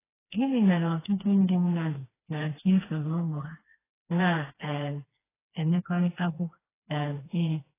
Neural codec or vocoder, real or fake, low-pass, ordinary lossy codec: codec, 16 kHz, 2 kbps, FreqCodec, smaller model; fake; 3.6 kHz; AAC, 16 kbps